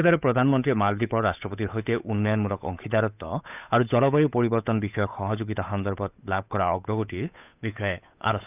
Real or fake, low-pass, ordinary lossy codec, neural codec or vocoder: fake; 3.6 kHz; none; codec, 16 kHz, 8 kbps, FunCodec, trained on Chinese and English, 25 frames a second